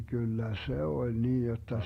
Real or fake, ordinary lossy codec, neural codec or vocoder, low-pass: real; AAC, 48 kbps; none; 19.8 kHz